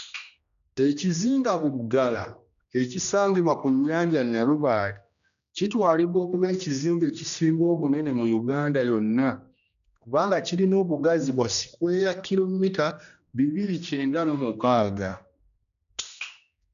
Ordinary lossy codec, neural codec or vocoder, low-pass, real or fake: none; codec, 16 kHz, 1 kbps, X-Codec, HuBERT features, trained on general audio; 7.2 kHz; fake